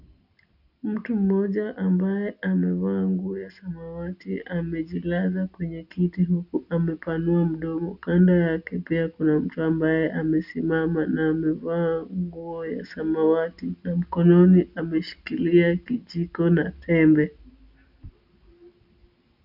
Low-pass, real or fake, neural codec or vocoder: 5.4 kHz; real; none